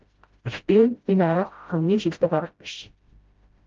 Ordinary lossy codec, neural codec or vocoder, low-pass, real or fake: Opus, 24 kbps; codec, 16 kHz, 0.5 kbps, FreqCodec, smaller model; 7.2 kHz; fake